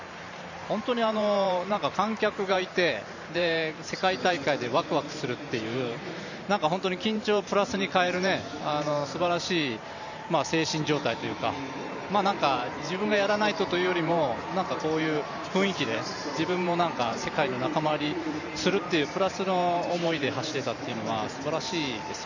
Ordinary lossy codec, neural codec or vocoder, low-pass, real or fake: none; vocoder, 44.1 kHz, 128 mel bands every 512 samples, BigVGAN v2; 7.2 kHz; fake